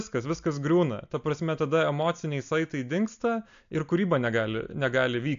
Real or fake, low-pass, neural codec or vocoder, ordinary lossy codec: real; 7.2 kHz; none; AAC, 64 kbps